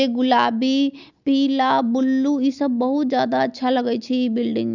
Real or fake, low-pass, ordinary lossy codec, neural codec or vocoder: real; 7.2 kHz; none; none